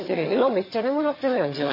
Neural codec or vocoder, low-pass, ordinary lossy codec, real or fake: vocoder, 22.05 kHz, 80 mel bands, HiFi-GAN; 5.4 kHz; MP3, 24 kbps; fake